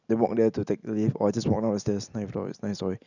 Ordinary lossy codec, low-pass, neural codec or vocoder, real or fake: none; 7.2 kHz; none; real